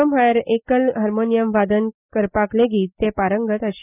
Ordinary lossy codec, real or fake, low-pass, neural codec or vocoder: none; real; 3.6 kHz; none